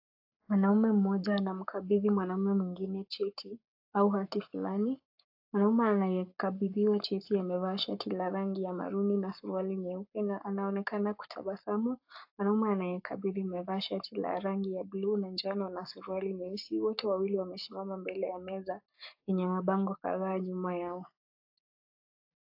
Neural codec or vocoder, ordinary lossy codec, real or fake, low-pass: codec, 44.1 kHz, 7.8 kbps, Pupu-Codec; AAC, 48 kbps; fake; 5.4 kHz